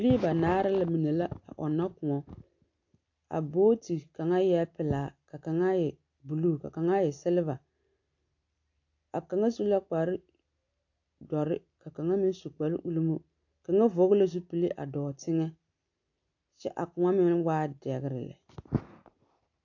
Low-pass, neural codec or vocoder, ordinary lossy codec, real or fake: 7.2 kHz; none; AAC, 48 kbps; real